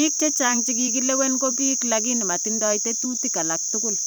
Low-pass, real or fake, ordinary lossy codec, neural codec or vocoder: none; fake; none; vocoder, 44.1 kHz, 128 mel bands every 512 samples, BigVGAN v2